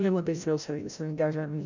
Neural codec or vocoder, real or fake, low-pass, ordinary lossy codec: codec, 16 kHz, 0.5 kbps, FreqCodec, larger model; fake; 7.2 kHz; none